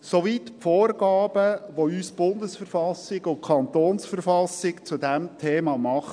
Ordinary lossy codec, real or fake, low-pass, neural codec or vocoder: MP3, 96 kbps; real; 9.9 kHz; none